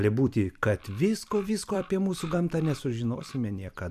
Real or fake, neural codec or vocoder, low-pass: fake; vocoder, 44.1 kHz, 128 mel bands every 256 samples, BigVGAN v2; 14.4 kHz